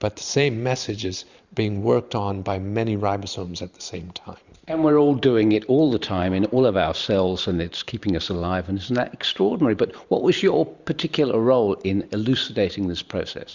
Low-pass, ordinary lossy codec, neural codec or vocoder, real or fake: 7.2 kHz; Opus, 64 kbps; vocoder, 44.1 kHz, 128 mel bands every 512 samples, BigVGAN v2; fake